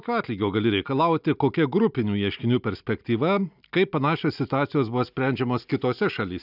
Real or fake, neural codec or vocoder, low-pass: real; none; 5.4 kHz